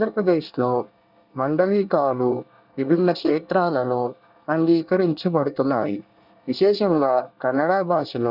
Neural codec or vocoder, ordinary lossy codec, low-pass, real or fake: codec, 24 kHz, 1 kbps, SNAC; none; 5.4 kHz; fake